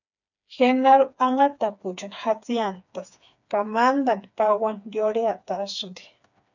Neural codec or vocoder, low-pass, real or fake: codec, 16 kHz, 4 kbps, FreqCodec, smaller model; 7.2 kHz; fake